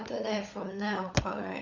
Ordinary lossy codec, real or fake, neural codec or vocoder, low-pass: Opus, 64 kbps; fake; codec, 16 kHz, 16 kbps, FunCodec, trained on LibriTTS, 50 frames a second; 7.2 kHz